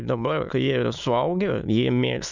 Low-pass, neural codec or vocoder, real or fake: 7.2 kHz; autoencoder, 22.05 kHz, a latent of 192 numbers a frame, VITS, trained on many speakers; fake